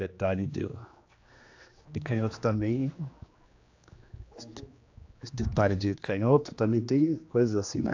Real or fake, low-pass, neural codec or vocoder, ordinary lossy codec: fake; 7.2 kHz; codec, 16 kHz, 2 kbps, X-Codec, HuBERT features, trained on general audio; AAC, 48 kbps